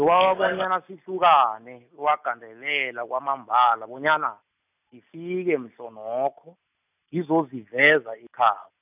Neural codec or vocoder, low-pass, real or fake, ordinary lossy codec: none; 3.6 kHz; real; none